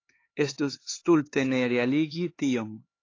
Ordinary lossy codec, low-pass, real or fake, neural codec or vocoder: AAC, 32 kbps; 7.2 kHz; fake; codec, 16 kHz, 4 kbps, X-Codec, HuBERT features, trained on LibriSpeech